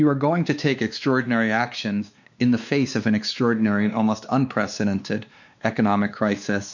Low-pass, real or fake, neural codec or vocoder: 7.2 kHz; fake; codec, 16 kHz, 2 kbps, X-Codec, WavLM features, trained on Multilingual LibriSpeech